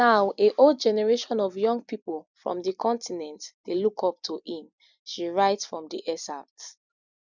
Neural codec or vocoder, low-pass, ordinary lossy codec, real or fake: vocoder, 44.1 kHz, 128 mel bands every 256 samples, BigVGAN v2; 7.2 kHz; none; fake